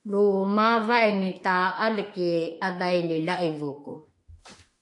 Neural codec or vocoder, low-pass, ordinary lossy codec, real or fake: autoencoder, 48 kHz, 32 numbers a frame, DAC-VAE, trained on Japanese speech; 10.8 kHz; MP3, 48 kbps; fake